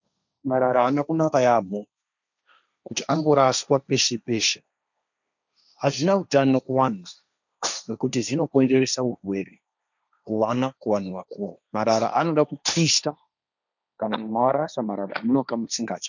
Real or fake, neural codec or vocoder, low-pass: fake; codec, 16 kHz, 1.1 kbps, Voila-Tokenizer; 7.2 kHz